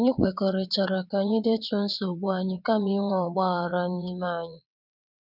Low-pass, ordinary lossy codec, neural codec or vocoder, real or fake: 5.4 kHz; none; vocoder, 22.05 kHz, 80 mel bands, WaveNeXt; fake